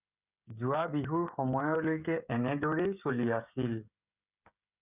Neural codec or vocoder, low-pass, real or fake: codec, 16 kHz, 16 kbps, FreqCodec, smaller model; 3.6 kHz; fake